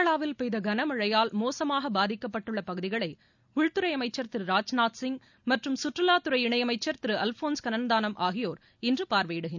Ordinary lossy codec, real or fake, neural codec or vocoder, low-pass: none; real; none; 7.2 kHz